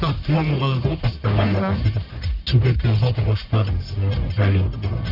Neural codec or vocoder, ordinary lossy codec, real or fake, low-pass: codec, 44.1 kHz, 1.7 kbps, Pupu-Codec; none; fake; 5.4 kHz